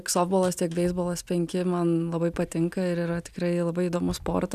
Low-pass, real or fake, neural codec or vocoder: 14.4 kHz; real; none